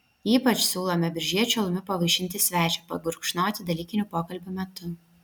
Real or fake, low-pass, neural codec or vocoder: real; 19.8 kHz; none